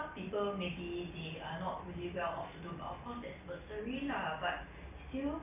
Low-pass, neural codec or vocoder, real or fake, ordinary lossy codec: 3.6 kHz; none; real; none